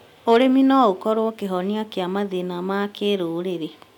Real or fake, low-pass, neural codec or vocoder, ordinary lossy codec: real; 19.8 kHz; none; none